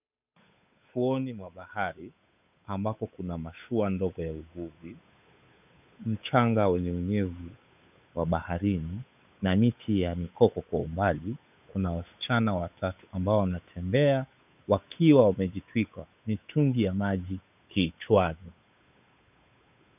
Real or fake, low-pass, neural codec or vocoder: fake; 3.6 kHz; codec, 16 kHz, 8 kbps, FunCodec, trained on Chinese and English, 25 frames a second